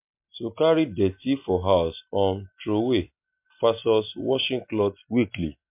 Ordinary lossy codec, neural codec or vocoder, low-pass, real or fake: MP3, 32 kbps; none; 3.6 kHz; real